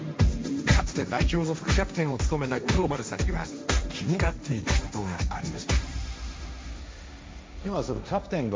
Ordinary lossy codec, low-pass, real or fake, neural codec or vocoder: none; none; fake; codec, 16 kHz, 1.1 kbps, Voila-Tokenizer